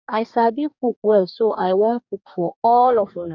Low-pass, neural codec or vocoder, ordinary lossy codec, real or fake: 7.2 kHz; codec, 44.1 kHz, 2.6 kbps, DAC; none; fake